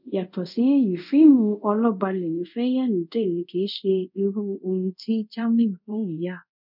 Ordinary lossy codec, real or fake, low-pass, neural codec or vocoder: none; fake; 5.4 kHz; codec, 24 kHz, 0.5 kbps, DualCodec